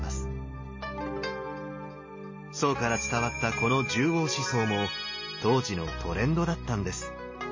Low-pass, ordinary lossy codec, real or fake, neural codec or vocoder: 7.2 kHz; MP3, 32 kbps; real; none